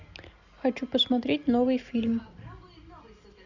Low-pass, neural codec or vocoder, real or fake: 7.2 kHz; none; real